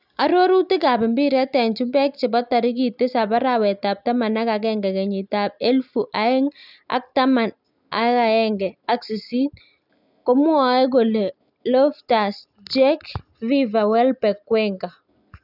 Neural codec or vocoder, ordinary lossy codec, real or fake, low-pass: none; none; real; 5.4 kHz